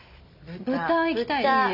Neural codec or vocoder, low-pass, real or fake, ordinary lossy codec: none; 5.4 kHz; real; none